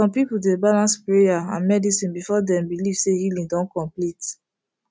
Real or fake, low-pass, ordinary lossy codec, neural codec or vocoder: real; none; none; none